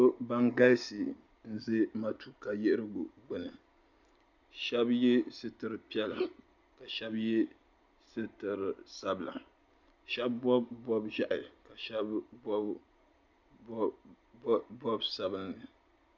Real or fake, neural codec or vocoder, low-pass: real; none; 7.2 kHz